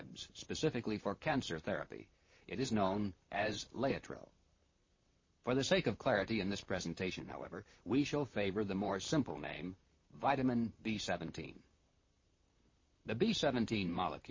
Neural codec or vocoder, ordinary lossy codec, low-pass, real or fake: vocoder, 44.1 kHz, 128 mel bands, Pupu-Vocoder; MP3, 32 kbps; 7.2 kHz; fake